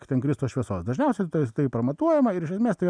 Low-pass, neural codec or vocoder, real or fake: 9.9 kHz; none; real